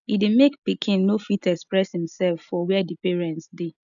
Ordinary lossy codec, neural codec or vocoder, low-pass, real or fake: none; none; 7.2 kHz; real